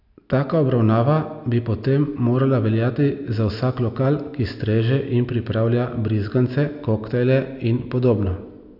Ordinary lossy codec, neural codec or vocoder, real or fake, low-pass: none; none; real; 5.4 kHz